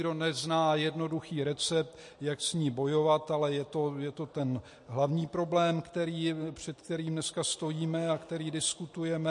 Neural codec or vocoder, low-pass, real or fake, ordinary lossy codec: none; 10.8 kHz; real; MP3, 48 kbps